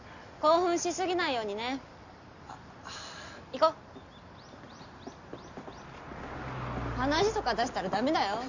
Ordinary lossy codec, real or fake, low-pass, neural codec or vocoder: none; real; 7.2 kHz; none